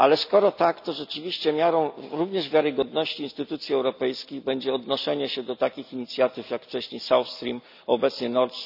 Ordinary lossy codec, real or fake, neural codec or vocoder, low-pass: none; real; none; 5.4 kHz